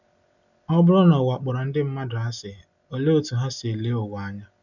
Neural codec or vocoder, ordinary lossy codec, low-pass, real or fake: none; none; 7.2 kHz; real